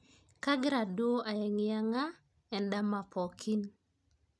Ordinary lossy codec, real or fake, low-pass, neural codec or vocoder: none; real; none; none